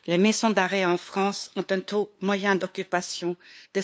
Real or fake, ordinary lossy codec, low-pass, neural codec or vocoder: fake; none; none; codec, 16 kHz, 2 kbps, FunCodec, trained on LibriTTS, 25 frames a second